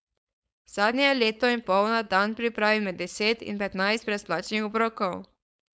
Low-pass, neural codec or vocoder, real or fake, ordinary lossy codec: none; codec, 16 kHz, 4.8 kbps, FACodec; fake; none